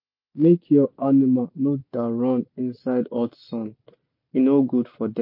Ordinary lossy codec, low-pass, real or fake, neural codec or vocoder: MP3, 32 kbps; 5.4 kHz; real; none